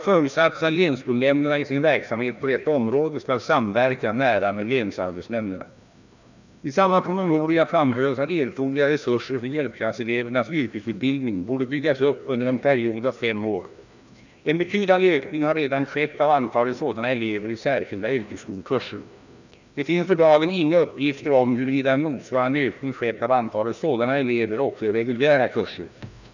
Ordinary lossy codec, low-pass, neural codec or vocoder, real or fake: none; 7.2 kHz; codec, 16 kHz, 1 kbps, FreqCodec, larger model; fake